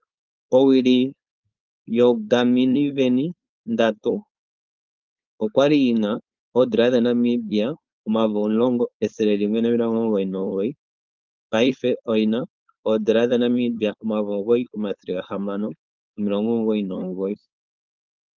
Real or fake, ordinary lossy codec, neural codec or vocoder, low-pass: fake; Opus, 32 kbps; codec, 16 kHz, 4.8 kbps, FACodec; 7.2 kHz